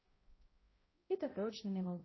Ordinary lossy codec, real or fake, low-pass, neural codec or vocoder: MP3, 24 kbps; fake; 7.2 kHz; codec, 16 kHz, 0.5 kbps, X-Codec, HuBERT features, trained on balanced general audio